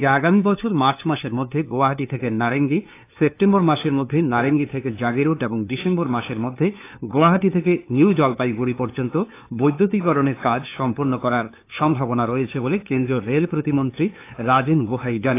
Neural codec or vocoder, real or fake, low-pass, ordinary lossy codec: codec, 16 kHz, 2 kbps, FunCodec, trained on LibriTTS, 25 frames a second; fake; 3.6 kHz; AAC, 24 kbps